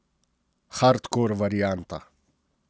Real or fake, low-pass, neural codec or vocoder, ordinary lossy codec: real; none; none; none